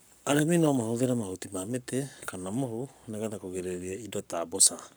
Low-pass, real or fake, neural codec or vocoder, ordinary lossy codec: none; fake; codec, 44.1 kHz, 7.8 kbps, Pupu-Codec; none